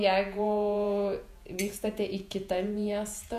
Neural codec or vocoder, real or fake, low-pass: vocoder, 48 kHz, 128 mel bands, Vocos; fake; 14.4 kHz